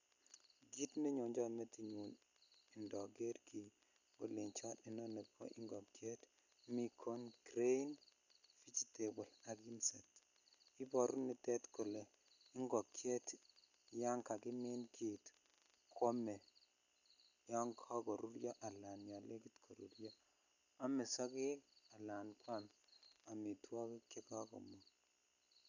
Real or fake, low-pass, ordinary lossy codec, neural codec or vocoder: real; 7.2 kHz; none; none